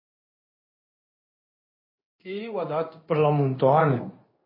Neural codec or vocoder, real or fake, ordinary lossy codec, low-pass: codec, 16 kHz in and 24 kHz out, 1 kbps, XY-Tokenizer; fake; MP3, 24 kbps; 5.4 kHz